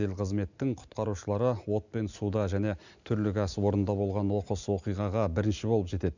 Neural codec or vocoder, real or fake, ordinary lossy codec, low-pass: none; real; none; 7.2 kHz